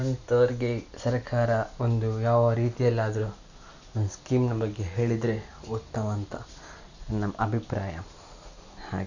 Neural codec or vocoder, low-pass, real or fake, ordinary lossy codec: none; 7.2 kHz; real; none